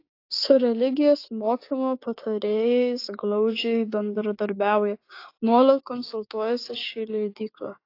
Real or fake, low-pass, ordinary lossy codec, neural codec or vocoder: fake; 5.4 kHz; AAC, 32 kbps; codec, 16 kHz, 6 kbps, DAC